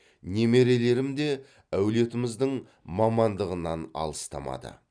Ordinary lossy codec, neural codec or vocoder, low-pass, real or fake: none; none; 9.9 kHz; real